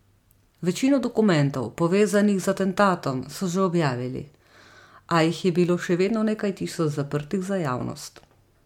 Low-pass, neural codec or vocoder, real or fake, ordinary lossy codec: 19.8 kHz; none; real; MP3, 96 kbps